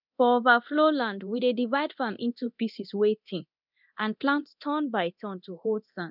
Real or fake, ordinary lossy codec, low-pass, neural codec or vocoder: fake; none; 5.4 kHz; codec, 24 kHz, 0.9 kbps, DualCodec